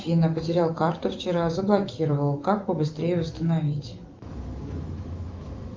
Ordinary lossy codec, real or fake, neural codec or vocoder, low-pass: Opus, 24 kbps; fake; autoencoder, 48 kHz, 128 numbers a frame, DAC-VAE, trained on Japanese speech; 7.2 kHz